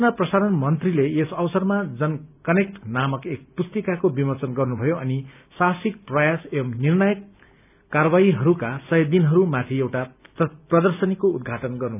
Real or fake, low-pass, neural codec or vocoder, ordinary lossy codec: real; 3.6 kHz; none; none